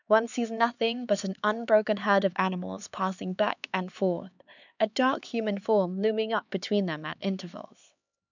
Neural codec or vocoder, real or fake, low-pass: codec, 16 kHz, 4 kbps, X-Codec, HuBERT features, trained on LibriSpeech; fake; 7.2 kHz